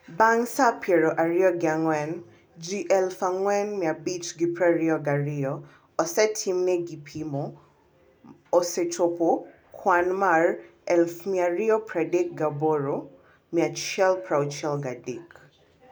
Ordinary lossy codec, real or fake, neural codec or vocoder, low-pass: none; real; none; none